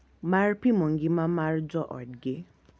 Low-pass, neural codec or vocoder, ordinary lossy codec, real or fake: none; none; none; real